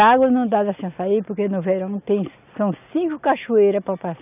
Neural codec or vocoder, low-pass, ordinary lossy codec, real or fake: none; 3.6 kHz; none; real